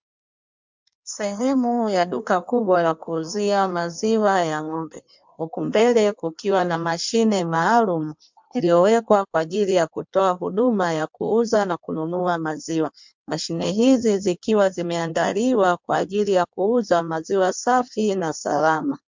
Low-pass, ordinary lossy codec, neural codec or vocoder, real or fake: 7.2 kHz; MP3, 64 kbps; codec, 16 kHz in and 24 kHz out, 1.1 kbps, FireRedTTS-2 codec; fake